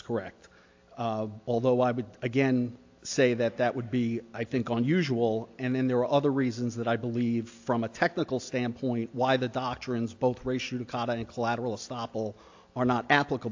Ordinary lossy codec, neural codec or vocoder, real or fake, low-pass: AAC, 48 kbps; none; real; 7.2 kHz